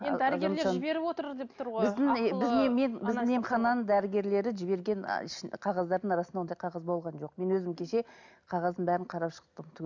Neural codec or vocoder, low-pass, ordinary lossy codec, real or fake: none; 7.2 kHz; none; real